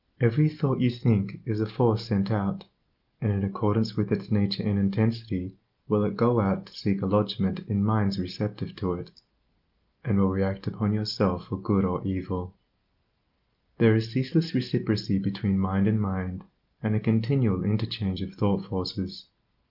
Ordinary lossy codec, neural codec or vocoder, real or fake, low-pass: Opus, 32 kbps; none; real; 5.4 kHz